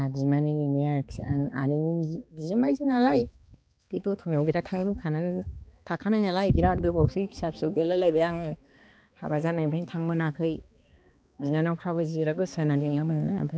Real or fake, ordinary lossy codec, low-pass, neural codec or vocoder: fake; none; none; codec, 16 kHz, 2 kbps, X-Codec, HuBERT features, trained on balanced general audio